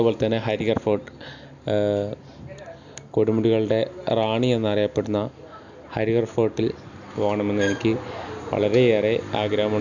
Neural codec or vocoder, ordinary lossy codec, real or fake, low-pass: none; none; real; 7.2 kHz